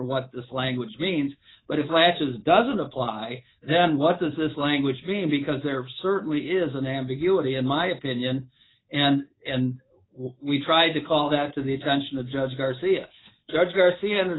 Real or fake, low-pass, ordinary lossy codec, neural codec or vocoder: fake; 7.2 kHz; AAC, 16 kbps; codec, 24 kHz, 3.1 kbps, DualCodec